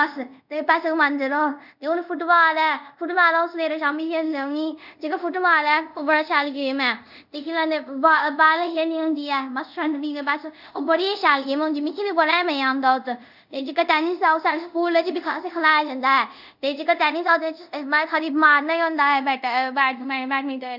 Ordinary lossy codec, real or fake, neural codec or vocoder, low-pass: none; fake; codec, 24 kHz, 0.5 kbps, DualCodec; 5.4 kHz